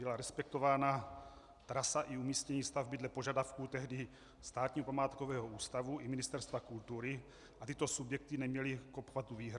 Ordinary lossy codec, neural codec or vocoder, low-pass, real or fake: Opus, 64 kbps; none; 10.8 kHz; real